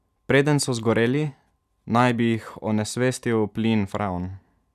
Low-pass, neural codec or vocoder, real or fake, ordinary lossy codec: 14.4 kHz; none; real; none